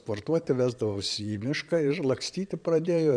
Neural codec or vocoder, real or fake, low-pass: vocoder, 22.05 kHz, 80 mel bands, Vocos; fake; 9.9 kHz